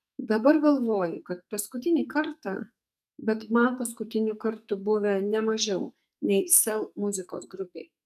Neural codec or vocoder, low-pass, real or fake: codec, 44.1 kHz, 2.6 kbps, SNAC; 14.4 kHz; fake